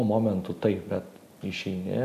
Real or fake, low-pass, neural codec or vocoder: real; 14.4 kHz; none